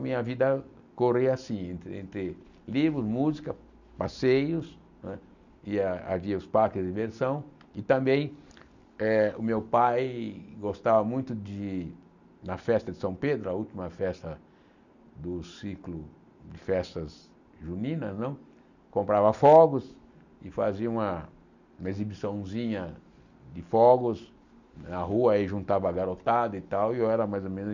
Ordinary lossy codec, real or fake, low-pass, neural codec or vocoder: none; real; 7.2 kHz; none